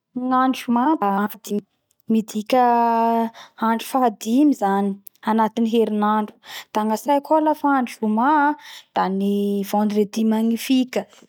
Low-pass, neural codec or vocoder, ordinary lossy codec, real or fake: 19.8 kHz; none; none; real